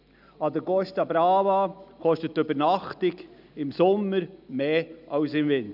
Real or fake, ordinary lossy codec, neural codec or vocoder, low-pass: real; none; none; 5.4 kHz